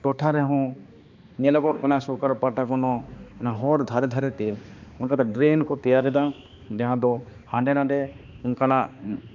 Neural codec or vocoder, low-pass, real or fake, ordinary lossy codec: codec, 16 kHz, 2 kbps, X-Codec, HuBERT features, trained on balanced general audio; 7.2 kHz; fake; MP3, 64 kbps